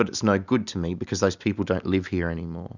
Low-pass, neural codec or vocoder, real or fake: 7.2 kHz; none; real